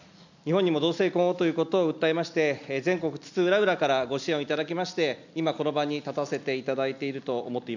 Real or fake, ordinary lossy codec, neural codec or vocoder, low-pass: real; none; none; 7.2 kHz